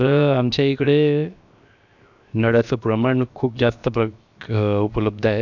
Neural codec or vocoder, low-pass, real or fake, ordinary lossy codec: codec, 16 kHz, 0.7 kbps, FocalCodec; 7.2 kHz; fake; none